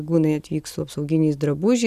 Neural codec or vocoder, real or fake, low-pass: none; real; 14.4 kHz